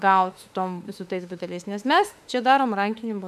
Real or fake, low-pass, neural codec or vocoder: fake; 14.4 kHz; autoencoder, 48 kHz, 32 numbers a frame, DAC-VAE, trained on Japanese speech